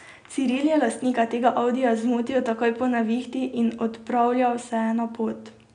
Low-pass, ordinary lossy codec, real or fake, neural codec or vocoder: 9.9 kHz; none; real; none